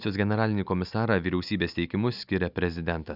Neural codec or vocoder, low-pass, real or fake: none; 5.4 kHz; real